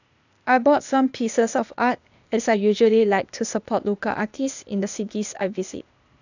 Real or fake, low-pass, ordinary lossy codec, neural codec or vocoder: fake; 7.2 kHz; none; codec, 16 kHz, 0.8 kbps, ZipCodec